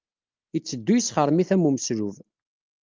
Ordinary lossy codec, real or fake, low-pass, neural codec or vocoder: Opus, 32 kbps; real; 7.2 kHz; none